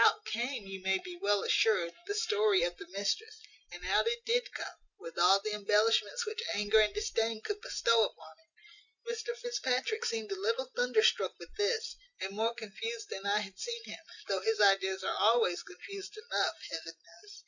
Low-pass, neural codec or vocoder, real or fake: 7.2 kHz; none; real